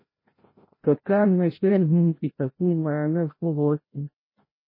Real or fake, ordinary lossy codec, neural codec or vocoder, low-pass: fake; MP3, 24 kbps; codec, 16 kHz, 0.5 kbps, FreqCodec, larger model; 5.4 kHz